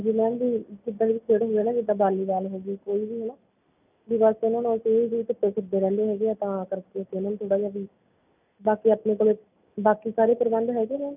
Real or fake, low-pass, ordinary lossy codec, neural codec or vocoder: real; 3.6 kHz; none; none